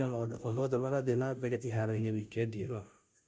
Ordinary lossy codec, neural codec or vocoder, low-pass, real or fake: none; codec, 16 kHz, 0.5 kbps, FunCodec, trained on Chinese and English, 25 frames a second; none; fake